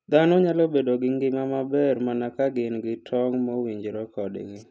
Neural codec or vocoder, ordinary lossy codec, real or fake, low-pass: none; none; real; none